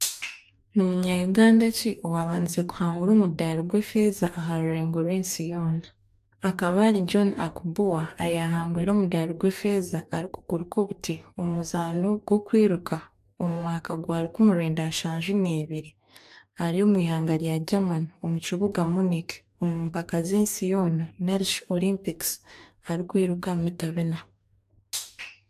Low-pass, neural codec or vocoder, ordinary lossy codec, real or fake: 14.4 kHz; codec, 44.1 kHz, 2.6 kbps, DAC; AAC, 96 kbps; fake